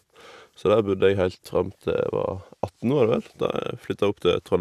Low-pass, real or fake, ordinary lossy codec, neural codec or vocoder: 14.4 kHz; real; none; none